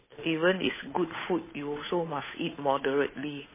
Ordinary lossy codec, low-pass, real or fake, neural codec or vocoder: MP3, 16 kbps; 3.6 kHz; fake; codec, 16 kHz, 8 kbps, FunCodec, trained on Chinese and English, 25 frames a second